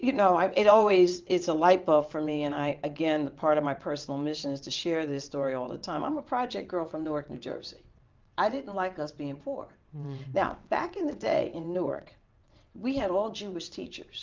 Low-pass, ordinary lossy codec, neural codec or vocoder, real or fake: 7.2 kHz; Opus, 32 kbps; vocoder, 22.05 kHz, 80 mel bands, WaveNeXt; fake